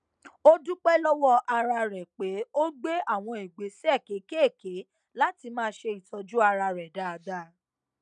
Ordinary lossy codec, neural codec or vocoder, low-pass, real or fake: none; none; 9.9 kHz; real